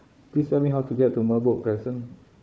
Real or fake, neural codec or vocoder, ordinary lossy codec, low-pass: fake; codec, 16 kHz, 4 kbps, FunCodec, trained on Chinese and English, 50 frames a second; none; none